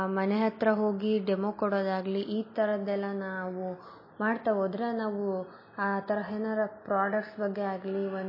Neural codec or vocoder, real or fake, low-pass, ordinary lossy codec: none; real; 5.4 kHz; MP3, 24 kbps